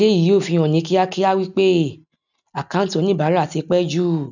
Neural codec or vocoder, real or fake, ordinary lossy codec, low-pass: none; real; none; 7.2 kHz